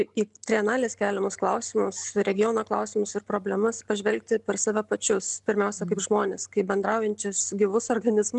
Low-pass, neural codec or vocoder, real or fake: 10.8 kHz; none; real